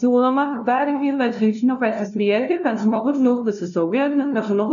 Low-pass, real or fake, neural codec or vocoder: 7.2 kHz; fake; codec, 16 kHz, 0.5 kbps, FunCodec, trained on LibriTTS, 25 frames a second